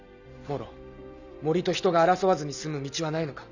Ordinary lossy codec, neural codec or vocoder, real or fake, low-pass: none; none; real; 7.2 kHz